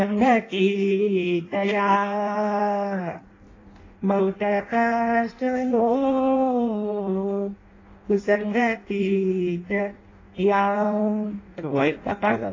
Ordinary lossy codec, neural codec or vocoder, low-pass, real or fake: AAC, 32 kbps; codec, 16 kHz in and 24 kHz out, 0.6 kbps, FireRedTTS-2 codec; 7.2 kHz; fake